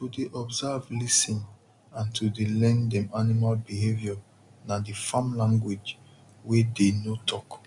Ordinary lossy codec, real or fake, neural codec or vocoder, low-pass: none; real; none; 10.8 kHz